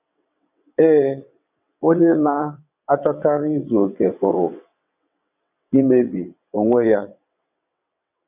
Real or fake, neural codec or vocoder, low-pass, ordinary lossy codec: fake; vocoder, 22.05 kHz, 80 mel bands, WaveNeXt; 3.6 kHz; none